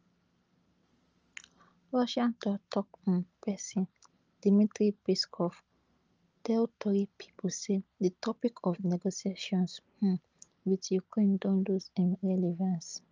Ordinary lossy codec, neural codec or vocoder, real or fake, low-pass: Opus, 24 kbps; none; real; 7.2 kHz